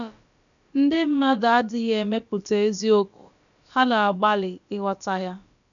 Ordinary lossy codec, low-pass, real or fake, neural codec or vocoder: none; 7.2 kHz; fake; codec, 16 kHz, about 1 kbps, DyCAST, with the encoder's durations